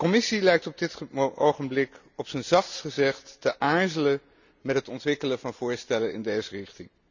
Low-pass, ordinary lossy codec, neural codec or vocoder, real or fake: 7.2 kHz; none; none; real